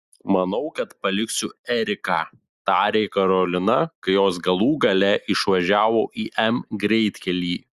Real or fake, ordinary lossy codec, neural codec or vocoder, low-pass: real; Opus, 64 kbps; none; 14.4 kHz